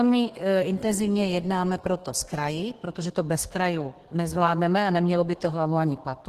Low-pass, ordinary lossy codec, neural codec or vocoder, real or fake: 14.4 kHz; Opus, 16 kbps; codec, 32 kHz, 1.9 kbps, SNAC; fake